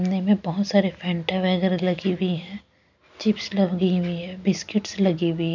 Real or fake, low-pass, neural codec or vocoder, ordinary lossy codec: real; 7.2 kHz; none; none